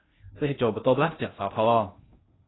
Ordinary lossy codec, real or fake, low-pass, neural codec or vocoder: AAC, 16 kbps; fake; 7.2 kHz; codec, 16 kHz in and 24 kHz out, 0.6 kbps, FocalCodec, streaming, 2048 codes